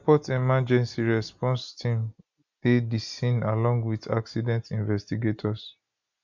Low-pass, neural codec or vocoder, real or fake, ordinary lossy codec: 7.2 kHz; none; real; none